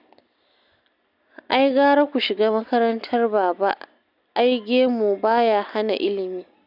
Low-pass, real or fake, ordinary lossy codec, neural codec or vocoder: 5.4 kHz; real; none; none